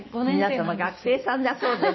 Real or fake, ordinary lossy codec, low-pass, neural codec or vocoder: fake; MP3, 24 kbps; 7.2 kHz; codec, 16 kHz, 6 kbps, DAC